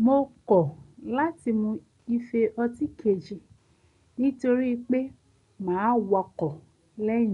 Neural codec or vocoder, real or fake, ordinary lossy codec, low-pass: none; real; none; 10.8 kHz